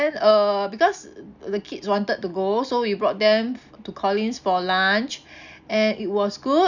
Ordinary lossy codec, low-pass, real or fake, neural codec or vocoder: none; 7.2 kHz; real; none